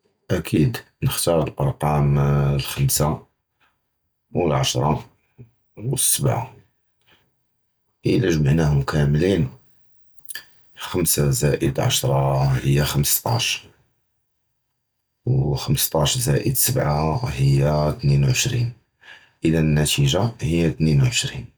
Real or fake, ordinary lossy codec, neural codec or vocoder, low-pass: real; none; none; none